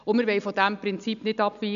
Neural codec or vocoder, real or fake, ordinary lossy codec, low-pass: none; real; none; 7.2 kHz